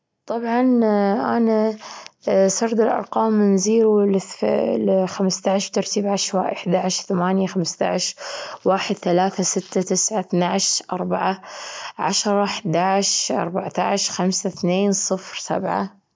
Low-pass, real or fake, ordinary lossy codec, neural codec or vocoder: none; real; none; none